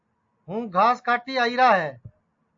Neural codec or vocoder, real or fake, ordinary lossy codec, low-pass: none; real; AAC, 64 kbps; 7.2 kHz